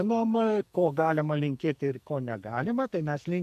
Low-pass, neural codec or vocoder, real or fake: 14.4 kHz; codec, 44.1 kHz, 2.6 kbps, SNAC; fake